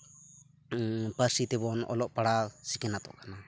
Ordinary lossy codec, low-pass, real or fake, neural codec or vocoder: none; none; real; none